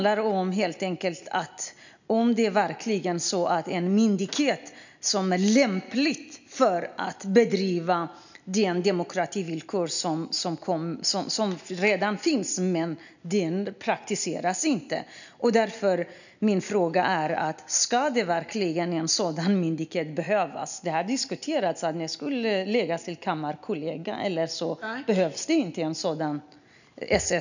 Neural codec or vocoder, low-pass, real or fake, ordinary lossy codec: none; 7.2 kHz; real; none